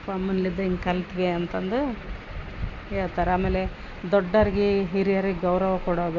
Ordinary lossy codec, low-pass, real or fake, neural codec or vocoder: AAC, 48 kbps; 7.2 kHz; real; none